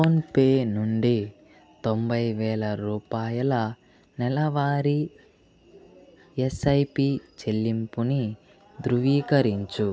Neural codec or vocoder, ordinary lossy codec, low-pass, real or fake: none; none; none; real